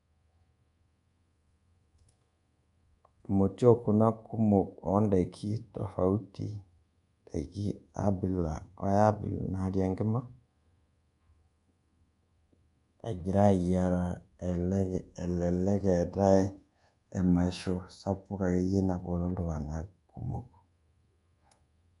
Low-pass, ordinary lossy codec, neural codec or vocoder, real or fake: 10.8 kHz; none; codec, 24 kHz, 1.2 kbps, DualCodec; fake